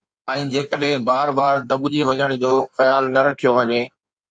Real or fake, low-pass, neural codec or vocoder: fake; 9.9 kHz; codec, 16 kHz in and 24 kHz out, 1.1 kbps, FireRedTTS-2 codec